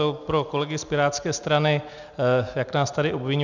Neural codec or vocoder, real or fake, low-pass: none; real; 7.2 kHz